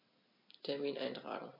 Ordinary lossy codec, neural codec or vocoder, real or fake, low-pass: MP3, 32 kbps; none; real; 5.4 kHz